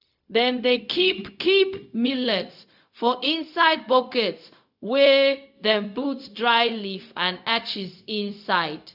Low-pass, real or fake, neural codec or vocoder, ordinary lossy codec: 5.4 kHz; fake; codec, 16 kHz, 0.4 kbps, LongCat-Audio-Codec; none